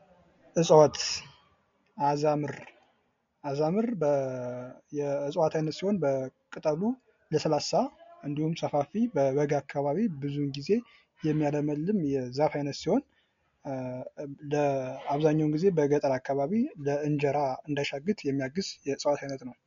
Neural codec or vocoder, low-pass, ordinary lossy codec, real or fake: none; 7.2 kHz; MP3, 48 kbps; real